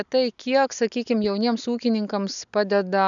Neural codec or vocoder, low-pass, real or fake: none; 7.2 kHz; real